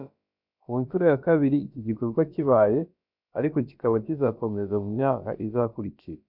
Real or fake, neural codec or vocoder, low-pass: fake; codec, 16 kHz, about 1 kbps, DyCAST, with the encoder's durations; 5.4 kHz